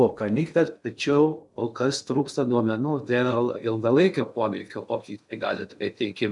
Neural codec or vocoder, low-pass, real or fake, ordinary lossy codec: codec, 16 kHz in and 24 kHz out, 0.6 kbps, FocalCodec, streaming, 2048 codes; 10.8 kHz; fake; MP3, 96 kbps